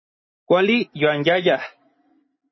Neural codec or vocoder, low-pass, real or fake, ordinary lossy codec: none; 7.2 kHz; real; MP3, 24 kbps